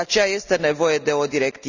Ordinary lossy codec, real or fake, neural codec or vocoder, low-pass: none; real; none; 7.2 kHz